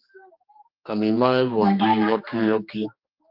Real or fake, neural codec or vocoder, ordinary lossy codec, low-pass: fake; codec, 16 kHz, 2 kbps, X-Codec, HuBERT features, trained on general audio; Opus, 24 kbps; 5.4 kHz